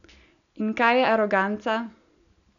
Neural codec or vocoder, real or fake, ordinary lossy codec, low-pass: none; real; none; 7.2 kHz